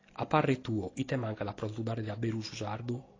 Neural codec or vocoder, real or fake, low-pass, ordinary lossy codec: none; real; 7.2 kHz; AAC, 32 kbps